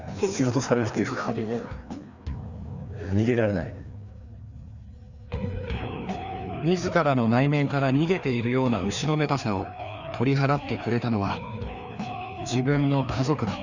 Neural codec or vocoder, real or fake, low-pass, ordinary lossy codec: codec, 16 kHz, 2 kbps, FreqCodec, larger model; fake; 7.2 kHz; none